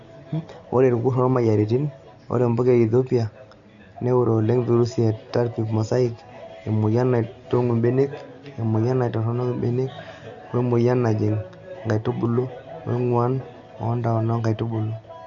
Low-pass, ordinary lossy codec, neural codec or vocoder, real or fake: 7.2 kHz; none; none; real